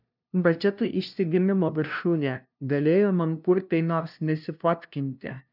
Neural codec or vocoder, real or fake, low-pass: codec, 16 kHz, 0.5 kbps, FunCodec, trained on LibriTTS, 25 frames a second; fake; 5.4 kHz